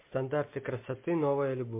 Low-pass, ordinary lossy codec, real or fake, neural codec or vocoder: 3.6 kHz; MP3, 24 kbps; real; none